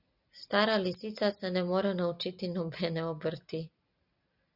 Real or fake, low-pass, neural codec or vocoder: real; 5.4 kHz; none